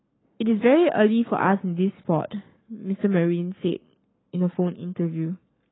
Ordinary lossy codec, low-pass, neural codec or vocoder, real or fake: AAC, 16 kbps; 7.2 kHz; codec, 44.1 kHz, 7.8 kbps, Pupu-Codec; fake